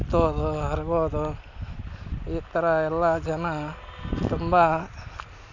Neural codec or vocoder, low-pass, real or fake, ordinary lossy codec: none; 7.2 kHz; real; none